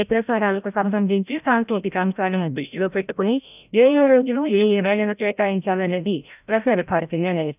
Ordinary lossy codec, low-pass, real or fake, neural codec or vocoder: none; 3.6 kHz; fake; codec, 16 kHz, 0.5 kbps, FreqCodec, larger model